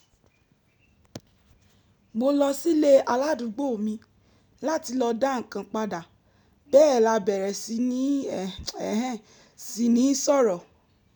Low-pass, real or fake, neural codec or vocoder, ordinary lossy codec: none; real; none; none